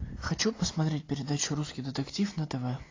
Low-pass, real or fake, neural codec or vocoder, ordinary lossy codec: 7.2 kHz; real; none; AAC, 32 kbps